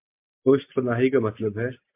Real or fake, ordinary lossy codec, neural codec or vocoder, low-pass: real; MP3, 32 kbps; none; 3.6 kHz